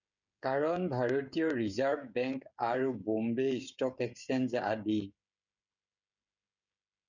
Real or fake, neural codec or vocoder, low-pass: fake; codec, 16 kHz, 16 kbps, FreqCodec, smaller model; 7.2 kHz